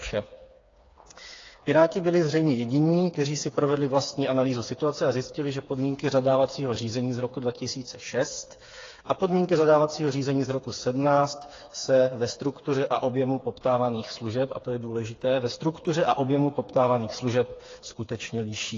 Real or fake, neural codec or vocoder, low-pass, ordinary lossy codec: fake; codec, 16 kHz, 4 kbps, FreqCodec, smaller model; 7.2 kHz; AAC, 32 kbps